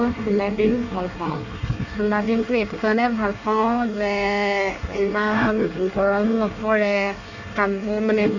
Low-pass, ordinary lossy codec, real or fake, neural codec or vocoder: 7.2 kHz; none; fake; codec, 24 kHz, 1 kbps, SNAC